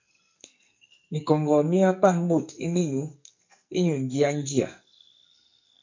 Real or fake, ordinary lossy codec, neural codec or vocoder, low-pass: fake; MP3, 48 kbps; codec, 44.1 kHz, 2.6 kbps, SNAC; 7.2 kHz